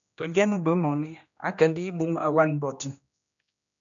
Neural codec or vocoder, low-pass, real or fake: codec, 16 kHz, 1 kbps, X-Codec, HuBERT features, trained on general audio; 7.2 kHz; fake